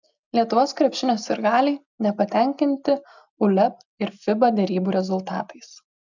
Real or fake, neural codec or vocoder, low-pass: real; none; 7.2 kHz